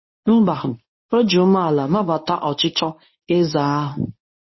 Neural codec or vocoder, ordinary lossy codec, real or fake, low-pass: codec, 24 kHz, 0.9 kbps, WavTokenizer, medium speech release version 1; MP3, 24 kbps; fake; 7.2 kHz